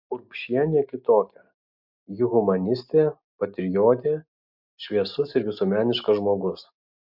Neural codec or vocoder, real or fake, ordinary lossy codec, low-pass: none; real; MP3, 48 kbps; 5.4 kHz